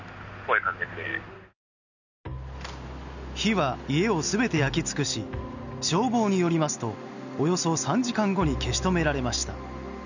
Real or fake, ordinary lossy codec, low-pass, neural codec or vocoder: real; none; 7.2 kHz; none